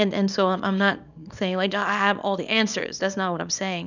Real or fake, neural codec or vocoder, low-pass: fake; codec, 24 kHz, 0.9 kbps, WavTokenizer, small release; 7.2 kHz